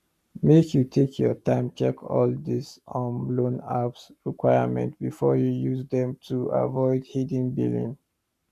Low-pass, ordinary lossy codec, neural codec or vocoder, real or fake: 14.4 kHz; none; codec, 44.1 kHz, 7.8 kbps, Pupu-Codec; fake